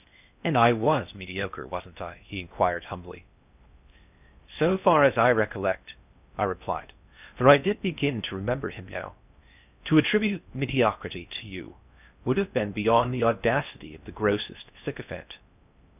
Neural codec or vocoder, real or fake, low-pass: codec, 16 kHz in and 24 kHz out, 0.6 kbps, FocalCodec, streaming, 2048 codes; fake; 3.6 kHz